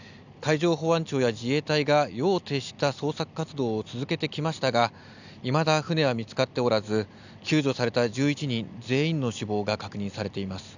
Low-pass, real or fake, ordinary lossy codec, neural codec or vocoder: 7.2 kHz; real; none; none